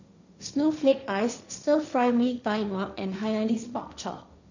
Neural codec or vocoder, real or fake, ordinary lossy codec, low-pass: codec, 16 kHz, 1.1 kbps, Voila-Tokenizer; fake; none; 7.2 kHz